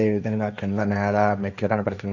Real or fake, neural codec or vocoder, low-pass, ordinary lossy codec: fake; codec, 16 kHz, 1.1 kbps, Voila-Tokenizer; 7.2 kHz; none